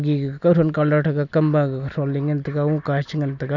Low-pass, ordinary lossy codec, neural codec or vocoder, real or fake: 7.2 kHz; none; none; real